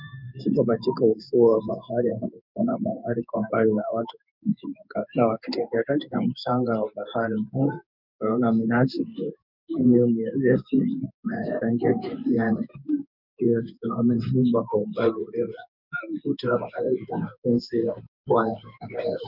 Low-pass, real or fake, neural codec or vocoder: 5.4 kHz; fake; codec, 16 kHz in and 24 kHz out, 1 kbps, XY-Tokenizer